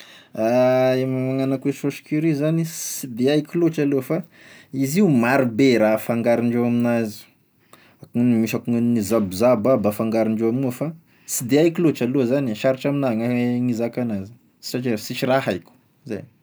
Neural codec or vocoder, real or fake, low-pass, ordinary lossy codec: none; real; none; none